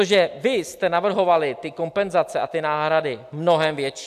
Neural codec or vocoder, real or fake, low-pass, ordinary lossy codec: none; real; 14.4 kHz; AAC, 96 kbps